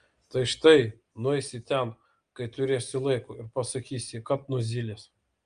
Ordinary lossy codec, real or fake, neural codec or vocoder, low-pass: Opus, 32 kbps; real; none; 9.9 kHz